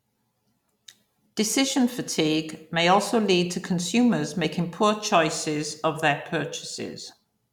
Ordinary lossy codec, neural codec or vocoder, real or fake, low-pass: none; none; real; 19.8 kHz